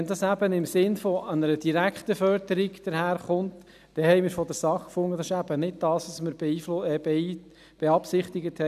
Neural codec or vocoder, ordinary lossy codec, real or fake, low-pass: none; none; real; 14.4 kHz